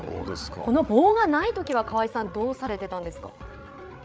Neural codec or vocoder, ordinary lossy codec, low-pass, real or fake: codec, 16 kHz, 8 kbps, FreqCodec, larger model; none; none; fake